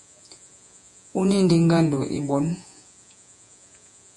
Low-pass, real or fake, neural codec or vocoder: 10.8 kHz; fake; vocoder, 48 kHz, 128 mel bands, Vocos